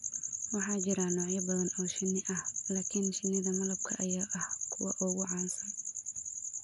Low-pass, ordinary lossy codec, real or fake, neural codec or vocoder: 10.8 kHz; none; real; none